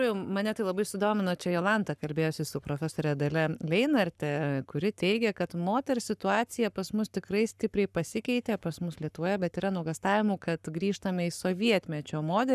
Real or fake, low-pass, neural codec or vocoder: fake; 14.4 kHz; codec, 44.1 kHz, 7.8 kbps, Pupu-Codec